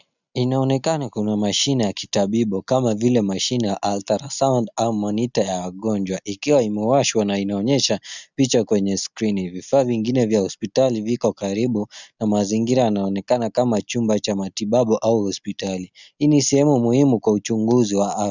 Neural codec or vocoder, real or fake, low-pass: none; real; 7.2 kHz